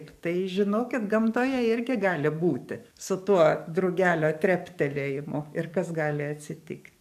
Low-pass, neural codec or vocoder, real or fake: 14.4 kHz; codec, 44.1 kHz, 7.8 kbps, DAC; fake